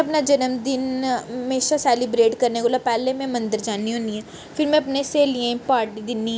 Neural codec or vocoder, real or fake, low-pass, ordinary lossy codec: none; real; none; none